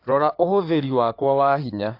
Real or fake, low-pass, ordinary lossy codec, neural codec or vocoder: fake; 5.4 kHz; AAC, 32 kbps; codec, 16 kHz in and 24 kHz out, 2.2 kbps, FireRedTTS-2 codec